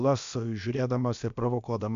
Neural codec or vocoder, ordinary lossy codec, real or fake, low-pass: codec, 16 kHz, about 1 kbps, DyCAST, with the encoder's durations; MP3, 64 kbps; fake; 7.2 kHz